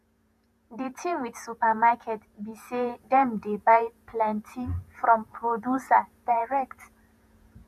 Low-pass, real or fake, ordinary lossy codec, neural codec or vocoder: 14.4 kHz; fake; none; vocoder, 48 kHz, 128 mel bands, Vocos